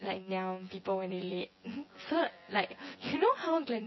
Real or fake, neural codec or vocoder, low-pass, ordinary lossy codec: fake; vocoder, 24 kHz, 100 mel bands, Vocos; 7.2 kHz; MP3, 24 kbps